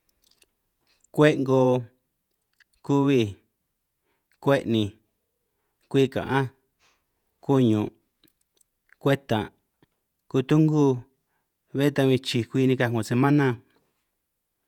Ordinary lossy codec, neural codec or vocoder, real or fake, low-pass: none; vocoder, 48 kHz, 128 mel bands, Vocos; fake; 19.8 kHz